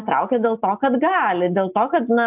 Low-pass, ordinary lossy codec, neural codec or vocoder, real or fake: 3.6 kHz; AAC, 32 kbps; none; real